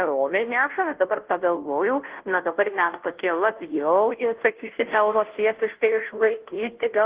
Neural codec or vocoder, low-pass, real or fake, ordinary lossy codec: codec, 16 kHz, 0.5 kbps, FunCodec, trained on Chinese and English, 25 frames a second; 3.6 kHz; fake; Opus, 16 kbps